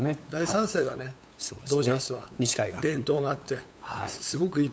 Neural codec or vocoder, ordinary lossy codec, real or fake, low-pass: codec, 16 kHz, 8 kbps, FunCodec, trained on LibriTTS, 25 frames a second; none; fake; none